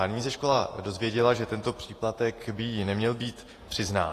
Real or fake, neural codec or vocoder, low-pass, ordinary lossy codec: fake; vocoder, 48 kHz, 128 mel bands, Vocos; 14.4 kHz; AAC, 48 kbps